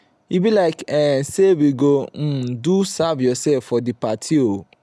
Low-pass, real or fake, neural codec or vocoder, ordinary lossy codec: none; real; none; none